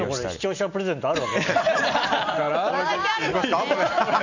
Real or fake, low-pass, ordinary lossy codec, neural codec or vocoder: real; 7.2 kHz; none; none